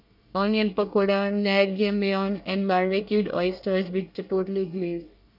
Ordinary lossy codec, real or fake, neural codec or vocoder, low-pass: none; fake; codec, 24 kHz, 1 kbps, SNAC; 5.4 kHz